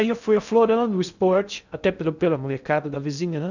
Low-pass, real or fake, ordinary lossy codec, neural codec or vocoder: 7.2 kHz; fake; none; codec, 16 kHz in and 24 kHz out, 0.6 kbps, FocalCodec, streaming, 2048 codes